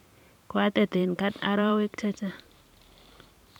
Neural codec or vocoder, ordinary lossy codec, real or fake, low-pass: vocoder, 44.1 kHz, 128 mel bands every 512 samples, BigVGAN v2; none; fake; 19.8 kHz